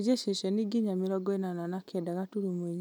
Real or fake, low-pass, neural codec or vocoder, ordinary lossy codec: real; none; none; none